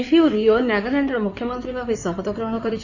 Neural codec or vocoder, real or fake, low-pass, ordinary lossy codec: codec, 16 kHz in and 24 kHz out, 2.2 kbps, FireRedTTS-2 codec; fake; 7.2 kHz; none